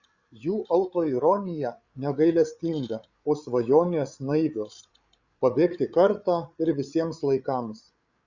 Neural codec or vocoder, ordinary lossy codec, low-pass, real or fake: codec, 16 kHz, 8 kbps, FreqCodec, larger model; Opus, 64 kbps; 7.2 kHz; fake